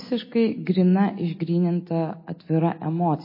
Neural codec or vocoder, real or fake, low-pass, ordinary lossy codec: none; real; 5.4 kHz; MP3, 24 kbps